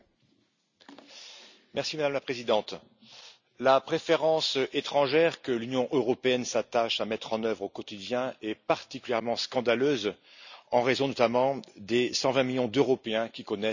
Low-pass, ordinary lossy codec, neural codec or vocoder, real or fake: 7.2 kHz; none; none; real